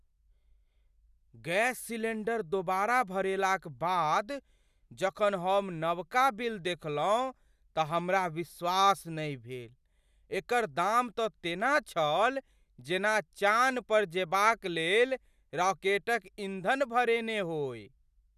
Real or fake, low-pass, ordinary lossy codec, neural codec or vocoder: real; 14.4 kHz; none; none